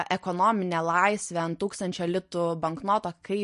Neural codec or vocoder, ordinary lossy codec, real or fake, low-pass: none; MP3, 48 kbps; real; 10.8 kHz